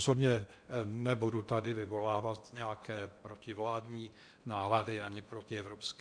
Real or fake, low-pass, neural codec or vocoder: fake; 9.9 kHz; codec, 16 kHz in and 24 kHz out, 0.8 kbps, FocalCodec, streaming, 65536 codes